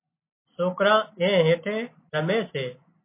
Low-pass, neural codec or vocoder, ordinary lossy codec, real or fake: 3.6 kHz; none; MP3, 24 kbps; real